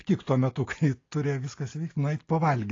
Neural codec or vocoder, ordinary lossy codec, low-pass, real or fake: none; AAC, 32 kbps; 7.2 kHz; real